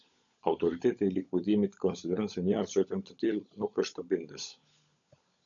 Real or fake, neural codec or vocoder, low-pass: fake; codec, 16 kHz, 16 kbps, FunCodec, trained on LibriTTS, 50 frames a second; 7.2 kHz